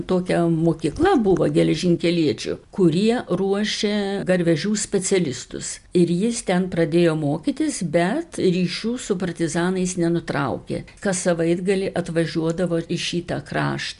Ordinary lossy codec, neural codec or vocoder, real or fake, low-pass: MP3, 96 kbps; none; real; 10.8 kHz